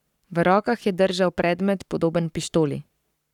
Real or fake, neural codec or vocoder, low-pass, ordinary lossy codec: fake; codec, 44.1 kHz, 7.8 kbps, Pupu-Codec; 19.8 kHz; none